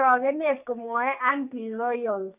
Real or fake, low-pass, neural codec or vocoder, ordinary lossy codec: fake; 3.6 kHz; codec, 44.1 kHz, 2.6 kbps, SNAC; none